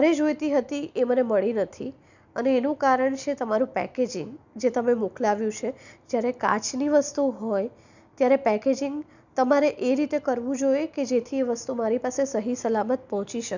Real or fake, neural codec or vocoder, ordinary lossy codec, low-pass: real; none; none; 7.2 kHz